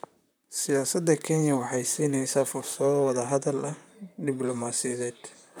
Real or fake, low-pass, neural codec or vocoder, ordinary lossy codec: fake; none; vocoder, 44.1 kHz, 128 mel bands, Pupu-Vocoder; none